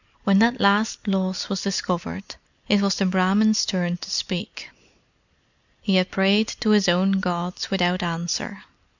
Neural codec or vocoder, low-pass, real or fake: none; 7.2 kHz; real